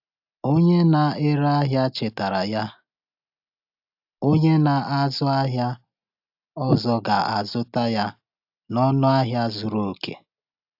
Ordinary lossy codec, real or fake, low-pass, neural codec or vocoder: AAC, 48 kbps; real; 5.4 kHz; none